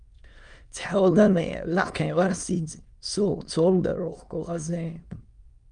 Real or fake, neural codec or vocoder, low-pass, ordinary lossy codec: fake; autoencoder, 22.05 kHz, a latent of 192 numbers a frame, VITS, trained on many speakers; 9.9 kHz; Opus, 32 kbps